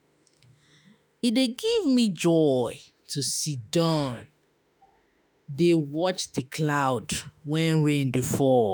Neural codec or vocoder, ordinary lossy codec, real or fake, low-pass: autoencoder, 48 kHz, 32 numbers a frame, DAC-VAE, trained on Japanese speech; none; fake; none